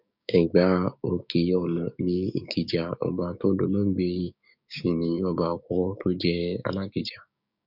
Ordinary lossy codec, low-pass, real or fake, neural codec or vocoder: none; 5.4 kHz; fake; codec, 16 kHz, 6 kbps, DAC